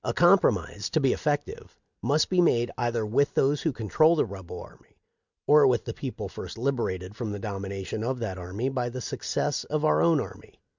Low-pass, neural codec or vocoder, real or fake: 7.2 kHz; none; real